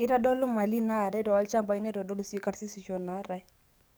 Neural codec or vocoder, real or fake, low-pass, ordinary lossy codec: codec, 44.1 kHz, 7.8 kbps, DAC; fake; none; none